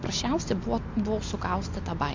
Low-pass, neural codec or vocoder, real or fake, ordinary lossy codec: 7.2 kHz; none; real; MP3, 48 kbps